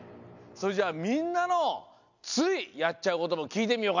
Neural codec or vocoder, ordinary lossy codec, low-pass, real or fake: none; none; 7.2 kHz; real